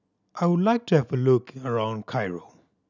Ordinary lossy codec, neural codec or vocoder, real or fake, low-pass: none; none; real; 7.2 kHz